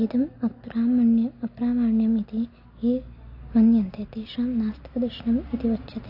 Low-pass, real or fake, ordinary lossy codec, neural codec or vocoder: 5.4 kHz; real; AAC, 32 kbps; none